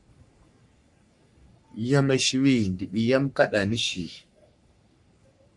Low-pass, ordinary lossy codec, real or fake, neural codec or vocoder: 10.8 kHz; MP3, 96 kbps; fake; codec, 44.1 kHz, 3.4 kbps, Pupu-Codec